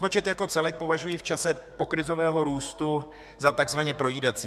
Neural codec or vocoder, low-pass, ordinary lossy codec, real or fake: codec, 32 kHz, 1.9 kbps, SNAC; 14.4 kHz; MP3, 96 kbps; fake